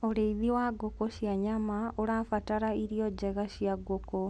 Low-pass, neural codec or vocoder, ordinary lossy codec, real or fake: none; none; none; real